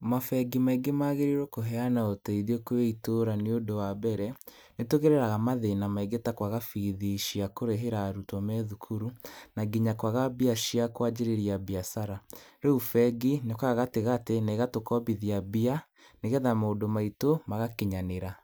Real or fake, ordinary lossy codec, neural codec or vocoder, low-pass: real; none; none; none